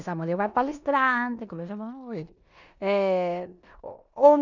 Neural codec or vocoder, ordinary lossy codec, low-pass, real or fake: codec, 16 kHz in and 24 kHz out, 0.9 kbps, LongCat-Audio-Codec, four codebook decoder; none; 7.2 kHz; fake